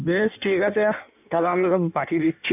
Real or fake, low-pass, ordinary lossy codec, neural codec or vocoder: fake; 3.6 kHz; none; codec, 16 kHz in and 24 kHz out, 1.1 kbps, FireRedTTS-2 codec